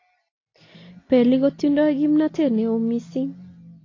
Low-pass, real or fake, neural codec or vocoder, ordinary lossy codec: 7.2 kHz; real; none; AAC, 32 kbps